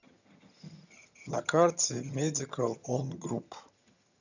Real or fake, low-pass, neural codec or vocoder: fake; 7.2 kHz; vocoder, 22.05 kHz, 80 mel bands, HiFi-GAN